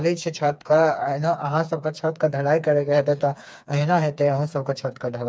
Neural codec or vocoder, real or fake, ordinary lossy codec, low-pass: codec, 16 kHz, 4 kbps, FreqCodec, smaller model; fake; none; none